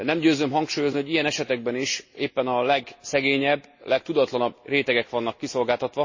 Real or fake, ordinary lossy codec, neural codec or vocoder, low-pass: real; MP3, 32 kbps; none; 7.2 kHz